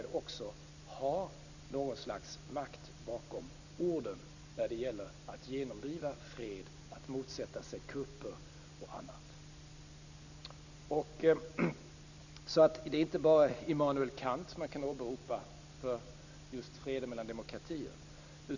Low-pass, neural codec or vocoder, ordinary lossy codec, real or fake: 7.2 kHz; none; none; real